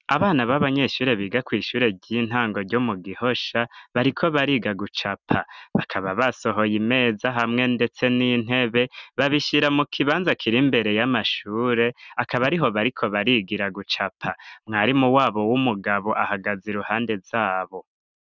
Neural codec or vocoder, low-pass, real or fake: none; 7.2 kHz; real